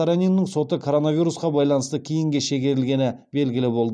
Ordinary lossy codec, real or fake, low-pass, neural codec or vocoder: none; real; 9.9 kHz; none